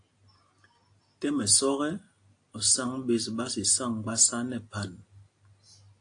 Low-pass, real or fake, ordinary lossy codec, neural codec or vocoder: 9.9 kHz; real; AAC, 48 kbps; none